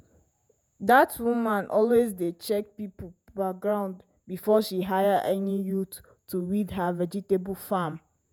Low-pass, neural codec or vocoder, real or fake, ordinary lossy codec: none; vocoder, 48 kHz, 128 mel bands, Vocos; fake; none